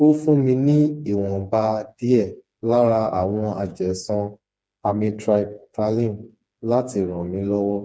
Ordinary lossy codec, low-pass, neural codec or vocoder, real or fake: none; none; codec, 16 kHz, 4 kbps, FreqCodec, smaller model; fake